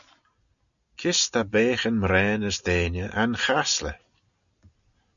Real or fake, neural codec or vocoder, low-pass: real; none; 7.2 kHz